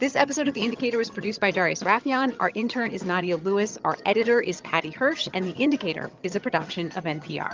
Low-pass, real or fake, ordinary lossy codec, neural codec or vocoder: 7.2 kHz; fake; Opus, 32 kbps; vocoder, 22.05 kHz, 80 mel bands, HiFi-GAN